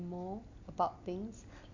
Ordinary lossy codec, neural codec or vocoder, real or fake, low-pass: AAC, 48 kbps; none; real; 7.2 kHz